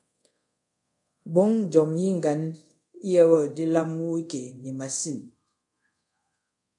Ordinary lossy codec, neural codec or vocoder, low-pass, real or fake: MP3, 64 kbps; codec, 24 kHz, 0.5 kbps, DualCodec; 10.8 kHz; fake